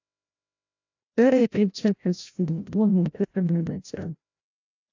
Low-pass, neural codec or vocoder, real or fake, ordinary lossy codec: 7.2 kHz; codec, 16 kHz, 0.5 kbps, FreqCodec, larger model; fake; AAC, 48 kbps